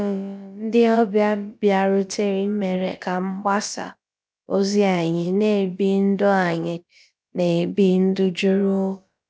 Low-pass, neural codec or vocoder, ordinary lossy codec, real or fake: none; codec, 16 kHz, about 1 kbps, DyCAST, with the encoder's durations; none; fake